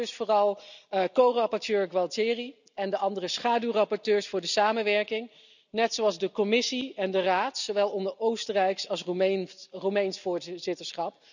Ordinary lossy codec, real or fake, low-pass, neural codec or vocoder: none; real; 7.2 kHz; none